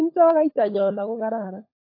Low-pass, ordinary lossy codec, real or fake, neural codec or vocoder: 5.4 kHz; AAC, 32 kbps; fake; codec, 16 kHz, 16 kbps, FunCodec, trained on LibriTTS, 50 frames a second